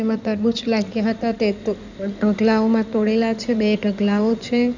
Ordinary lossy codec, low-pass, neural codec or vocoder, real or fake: none; 7.2 kHz; codec, 16 kHz in and 24 kHz out, 2.2 kbps, FireRedTTS-2 codec; fake